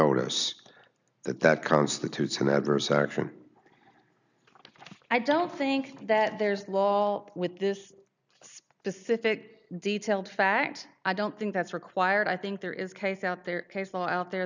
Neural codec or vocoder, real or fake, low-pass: none; real; 7.2 kHz